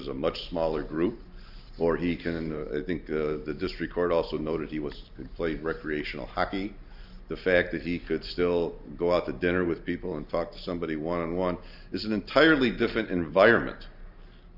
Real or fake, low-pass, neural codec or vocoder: real; 5.4 kHz; none